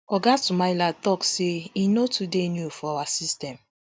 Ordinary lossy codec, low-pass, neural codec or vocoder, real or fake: none; none; none; real